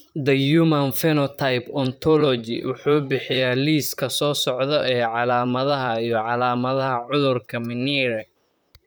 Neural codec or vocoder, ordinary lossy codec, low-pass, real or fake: vocoder, 44.1 kHz, 128 mel bands, Pupu-Vocoder; none; none; fake